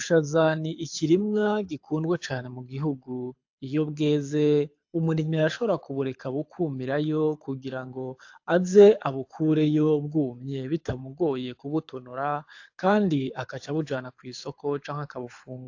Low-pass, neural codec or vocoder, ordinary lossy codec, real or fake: 7.2 kHz; codec, 24 kHz, 6 kbps, HILCodec; AAC, 48 kbps; fake